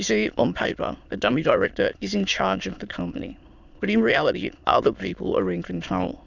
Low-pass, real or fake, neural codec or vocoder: 7.2 kHz; fake; autoencoder, 22.05 kHz, a latent of 192 numbers a frame, VITS, trained on many speakers